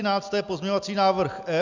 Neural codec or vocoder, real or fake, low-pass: none; real; 7.2 kHz